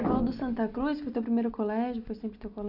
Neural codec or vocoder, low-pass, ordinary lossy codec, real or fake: none; 5.4 kHz; Opus, 64 kbps; real